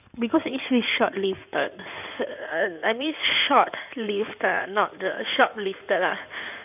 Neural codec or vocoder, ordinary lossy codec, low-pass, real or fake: codec, 16 kHz in and 24 kHz out, 2.2 kbps, FireRedTTS-2 codec; none; 3.6 kHz; fake